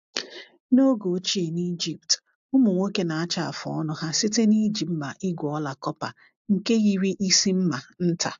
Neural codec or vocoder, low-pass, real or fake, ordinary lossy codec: none; 7.2 kHz; real; AAC, 64 kbps